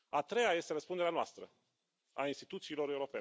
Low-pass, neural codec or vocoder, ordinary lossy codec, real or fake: none; none; none; real